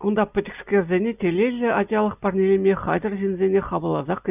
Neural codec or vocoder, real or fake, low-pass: none; real; 3.6 kHz